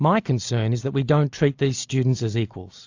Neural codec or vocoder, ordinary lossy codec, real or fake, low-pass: none; AAC, 48 kbps; real; 7.2 kHz